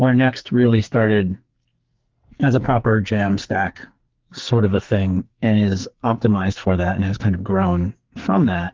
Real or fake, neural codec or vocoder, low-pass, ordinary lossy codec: fake; codec, 44.1 kHz, 2.6 kbps, SNAC; 7.2 kHz; Opus, 24 kbps